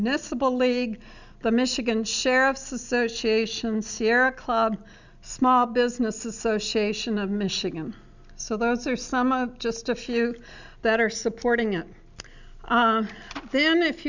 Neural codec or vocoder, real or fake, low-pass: none; real; 7.2 kHz